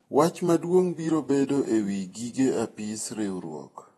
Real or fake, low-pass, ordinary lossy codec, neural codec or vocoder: fake; 19.8 kHz; AAC, 32 kbps; vocoder, 48 kHz, 128 mel bands, Vocos